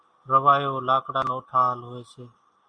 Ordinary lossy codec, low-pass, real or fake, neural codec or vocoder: Opus, 64 kbps; 9.9 kHz; real; none